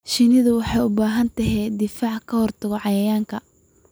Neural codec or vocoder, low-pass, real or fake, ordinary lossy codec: none; none; real; none